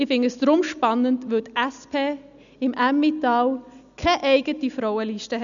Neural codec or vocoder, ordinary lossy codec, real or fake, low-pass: none; none; real; 7.2 kHz